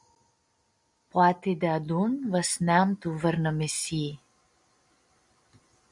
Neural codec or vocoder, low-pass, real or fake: none; 10.8 kHz; real